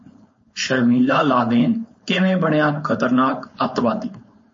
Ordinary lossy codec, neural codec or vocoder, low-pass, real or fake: MP3, 32 kbps; codec, 16 kHz, 4.8 kbps, FACodec; 7.2 kHz; fake